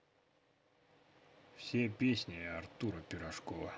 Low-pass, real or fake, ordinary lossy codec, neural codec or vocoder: none; real; none; none